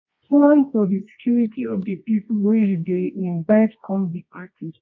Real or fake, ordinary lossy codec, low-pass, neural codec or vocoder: fake; MP3, 48 kbps; 7.2 kHz; codec, 24 kHz, 0.9 kbps, WavTokenizer, medium music audio release